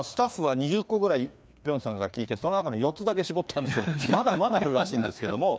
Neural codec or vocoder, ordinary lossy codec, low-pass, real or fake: codec, 16 kHz, 2 kbps, FreqCodec, larger model; none; none; fake